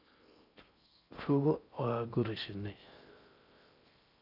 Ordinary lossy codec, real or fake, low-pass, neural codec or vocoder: none; fake; 5.4 kHz; codec, 16 kHz in and 24 kHz out, 0.6 kbps, FocalCodec, streaming, 4096 codes